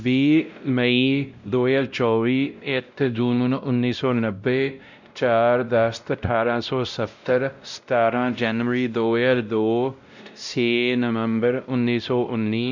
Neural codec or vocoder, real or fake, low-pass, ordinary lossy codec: codec, 16 kHz, 0.5 kbps, X-Codec, WavLM features, trained on Multilingual LibriSpeech; fake; 7.2 kHz; none